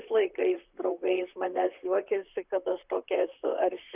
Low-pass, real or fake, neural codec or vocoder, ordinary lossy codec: 3.6 kHz; fake; vocoder, 44.1 kHz, 128 mel bands, Pupu-Vocoder; Opus, 64 kbps